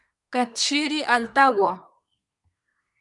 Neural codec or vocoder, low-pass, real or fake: codec, 24 kHz, 1 kbps, SNAC; 10.8 kHz; fake